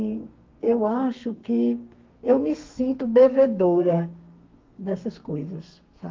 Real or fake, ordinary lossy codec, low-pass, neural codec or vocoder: fake; Opus, 24 kbps; 7.2 kHz; codec, 32 kHz, 1.9 kbps, SNAC